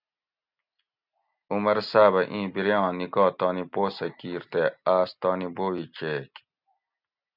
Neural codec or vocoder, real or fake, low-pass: none; real; 5.4 kHz